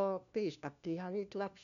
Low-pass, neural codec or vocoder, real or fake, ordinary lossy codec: 7.2 kHz; codec, 16 kHz, 1 kbps, FunCodec, trained on LibriTTS, 50 frames a second; fake; MP3, 96 kbps